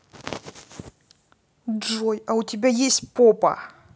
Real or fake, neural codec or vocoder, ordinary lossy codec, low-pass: real; none; none; none